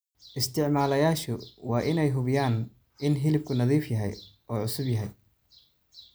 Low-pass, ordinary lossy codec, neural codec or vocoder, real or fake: none; none; none; real